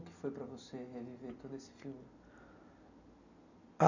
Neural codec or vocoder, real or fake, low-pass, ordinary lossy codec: none; real; 7.2 kHz; none